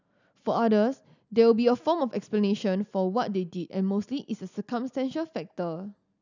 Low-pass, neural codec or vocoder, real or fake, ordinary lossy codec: 7.2 kHz; none; real; none